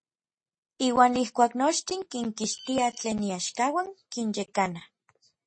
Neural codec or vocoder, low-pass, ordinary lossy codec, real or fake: none; 9.9 kHz; MP3, 32 kbps; real